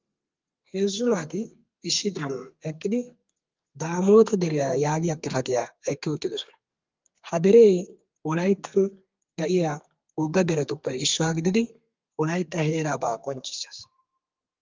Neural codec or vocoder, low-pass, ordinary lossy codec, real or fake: codec, 32 kHz, 1.9 kbps, SNAC; 7.2 kHz; Opus, 32 kbps; fake